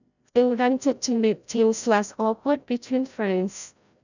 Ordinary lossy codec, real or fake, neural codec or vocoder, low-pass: none; fake; codec, 16 kHz, 0.5 kbps, FreqCodec, larger model; 7.2 kHz